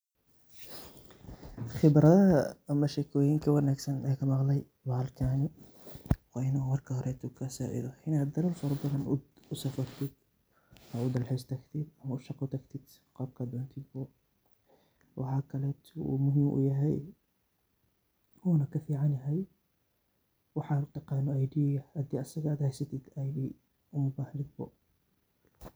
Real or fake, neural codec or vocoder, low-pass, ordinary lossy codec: real; none; none; none